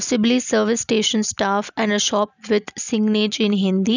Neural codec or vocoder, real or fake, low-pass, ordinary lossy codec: none; real; 7.2 kHz; none